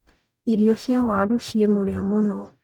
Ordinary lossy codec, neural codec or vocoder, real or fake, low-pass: none; codec, 44.1 kHz, 0.9 kbps, DAC; fake; 19.8 kHz